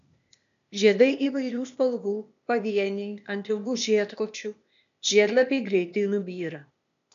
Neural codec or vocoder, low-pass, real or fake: codec, 16 kHz, 0.8 kbps, ZipCodec; 7.2 kHz; fake